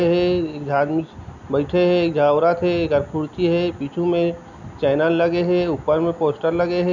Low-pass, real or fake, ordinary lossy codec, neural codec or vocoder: 7.2 kHz; real; none; none